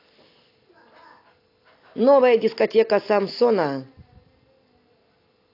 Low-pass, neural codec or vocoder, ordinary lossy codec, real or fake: 5.4 kHz; none; AAC, 32 kbps; real